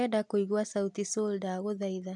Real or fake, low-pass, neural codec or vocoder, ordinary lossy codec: real; 10.8 kHz; none; none